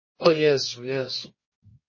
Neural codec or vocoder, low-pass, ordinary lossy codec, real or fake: codec, 24 kHz, 0.9 kbps, WavTokenizer, medium music audio release; 7.2 kHz; MP3, 32 kbps; fake